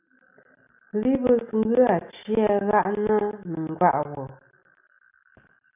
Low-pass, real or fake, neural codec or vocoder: 3.6 kHz; real; none